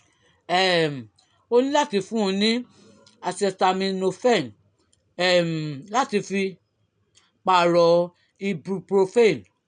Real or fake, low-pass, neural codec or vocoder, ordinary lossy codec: real; 9.9 kHz; none; none